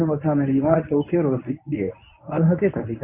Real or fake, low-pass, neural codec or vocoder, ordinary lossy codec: fake; 3.6 kHz; codec, 24 kHz, 0.9 kbps, WavTokenizer, medium speech release version 1; MP3, 32 kbps